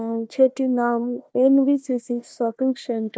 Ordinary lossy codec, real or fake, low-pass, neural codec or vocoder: none; fake; none; codec, 16 kHz, 1 kbps, FunCodec, trained on Chinese and English, 50 frames a second